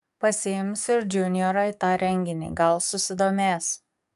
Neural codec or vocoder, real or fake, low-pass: codec, 44.1 kHz, 7.8 kbps, DAC; fake; 14.4 kHz